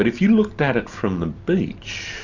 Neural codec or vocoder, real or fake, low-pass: none; real; 7.2 kHz